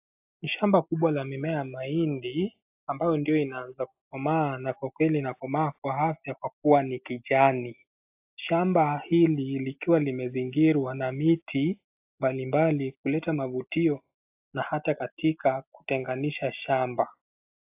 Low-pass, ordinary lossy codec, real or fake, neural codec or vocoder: 3.6 kHz; AAC, 32 kbps; real; none